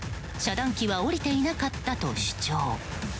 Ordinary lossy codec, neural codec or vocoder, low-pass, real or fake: none; none; none; real